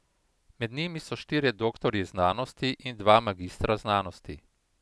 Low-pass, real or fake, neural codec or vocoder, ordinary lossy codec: none; real; none; none